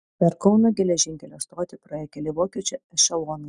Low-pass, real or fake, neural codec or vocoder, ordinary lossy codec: 9.9 kHz; real; none; Opus, 64 kbps